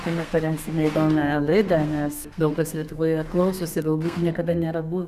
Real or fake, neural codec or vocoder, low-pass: fake; codec, 32 kHz, 1.9 kbps, SNAC; 14.4 kHz